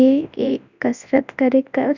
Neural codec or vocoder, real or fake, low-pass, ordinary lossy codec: codec, 24 kHz, 0.9 kbps, WavTokenizer, large speech release; fake; 7.2 kHz; none